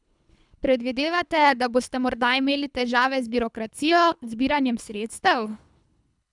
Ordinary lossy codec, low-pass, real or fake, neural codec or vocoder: none; 10.8 kHz; fake; codec, 24 kHz, 3 kbps, HILCodec